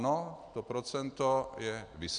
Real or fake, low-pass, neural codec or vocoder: real; 9.9 kHz; none